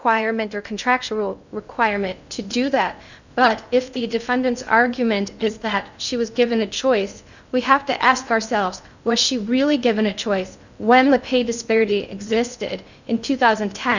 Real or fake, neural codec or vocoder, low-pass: fake; codec, 16 kHz in and 24 kHz out, 0.6 kbps, FocalCodec, streaming, 2048 codes; 7.2 kHz